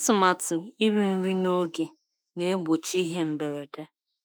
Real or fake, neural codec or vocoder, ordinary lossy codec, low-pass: fake; autoencoder, 48 kHz, 32 numbers a frame, DAC-VAE, trained on Japanese speech; none; none